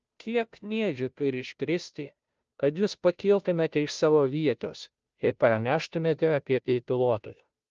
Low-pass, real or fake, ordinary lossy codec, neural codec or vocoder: 7.2 kHz; fake; Opus, 24 kbps; codec, 16 kHz, 0.5 kbps, FunCodec, trained on Chinese and English, 25 frames a second